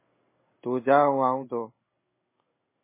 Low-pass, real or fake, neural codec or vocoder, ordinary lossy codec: 3.6 kHz; fake; codec, 16 kHz in and 24 kHz out, 1 kbps, XY-Tokenizer; MP3, 16 kbps